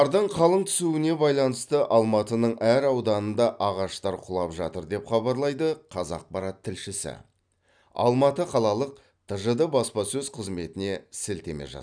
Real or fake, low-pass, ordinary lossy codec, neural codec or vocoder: real; 9.9 kHz; none; none